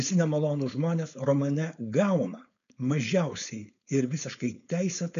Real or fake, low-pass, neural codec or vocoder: fake; 7.2 kHz; codec, 16 kHz, 4.8 kbps, FACodec